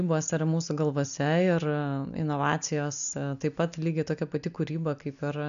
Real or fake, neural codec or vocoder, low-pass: real; none; 7.2 kHz